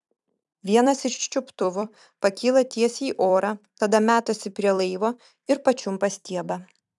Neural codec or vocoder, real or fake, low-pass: vocoder, 44.1 kHz, 128 mel bands every 256 samples, BigVGAN v2; fake; 10.8 kHz